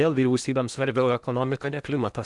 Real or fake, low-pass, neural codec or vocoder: fake; 10.8 kHz; codec, 16 kHz in and 24 kHz out, 0.8 kbps, FocalCodec, streaming, 65536 codes